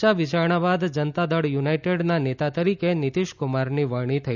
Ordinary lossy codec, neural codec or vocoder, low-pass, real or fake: none; none; 7.2 kHz; real